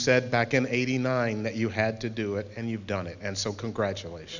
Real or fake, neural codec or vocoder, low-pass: real; none; 7.2 kHz